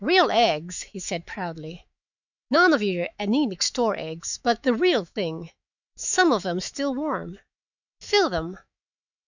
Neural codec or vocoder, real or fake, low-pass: autoencoder, 48 kHz, 128 numbers a frame, DAC-VAE, trained on Japanese speech; fake; 7.2 kHz